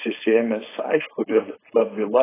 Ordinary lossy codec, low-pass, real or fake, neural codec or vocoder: AAC, 16 kbps; 3.6 kHz; fake; codec, 16 kHz, 4.8 kbps, FACodec